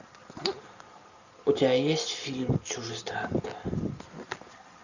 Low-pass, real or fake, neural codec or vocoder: 7.2 kHz; real; none